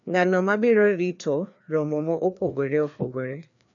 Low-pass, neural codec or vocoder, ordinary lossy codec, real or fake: 7.2 kHz; codec, 16 kHz, 2 kbps, FreqCodec, larger model; none; fake